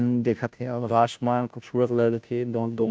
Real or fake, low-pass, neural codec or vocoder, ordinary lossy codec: fake; none; codec, 16 kHz, 0.5 kbps, FunCodec, trained on Chinese and English, 25 frames a second; none